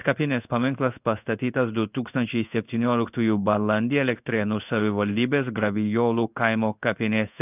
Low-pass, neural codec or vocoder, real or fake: 3.6 kHz; codec, 16 kHz in and 24 kHz out, 1 kbps, XY-Tokenizer; fake